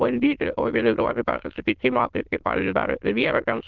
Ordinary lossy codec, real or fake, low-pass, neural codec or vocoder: Opus, 16 kbps; fake; 7.2 kHz; autoencoder, 22.05 kHz, a latent of 192 numbers a frame, VITS, trained on many speakers